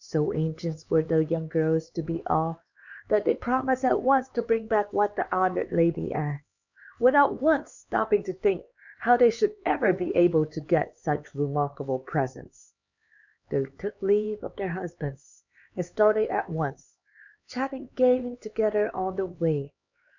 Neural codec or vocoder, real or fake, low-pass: codec, 16 kHz, 4 kbps, X-Codec, HuBERT features, trained on LibriSpeech; fake; 7.2 kHz